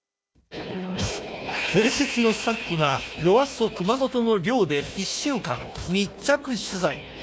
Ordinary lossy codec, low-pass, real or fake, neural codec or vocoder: none; none; fake; codec, 16 kHz, 1 kbps, FunCodec, trained on Chinese and English, 50 frames a second